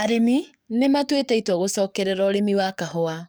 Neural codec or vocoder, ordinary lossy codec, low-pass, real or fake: codec, 44.1 kHz, 7.8 kbps, DAC; none; none; fake